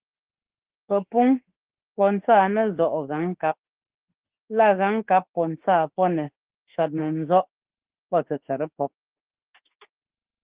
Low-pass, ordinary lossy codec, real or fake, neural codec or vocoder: 3.6 kHz; Opus, 16 kbps; fake; autoencoder, 48 kHz, 32 numbers a frame, DAC-VAE, trained on Japanese speech